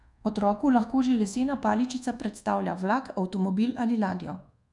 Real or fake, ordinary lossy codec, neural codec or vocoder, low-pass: fake; none; codec, 24 kHz, 1.2 kbps, DualCodec; 10.8 kHz